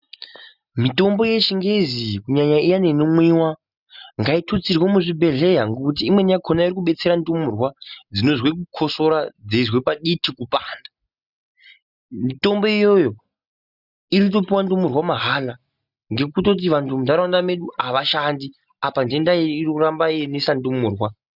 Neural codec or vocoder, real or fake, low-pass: none; real; 5.4 kHz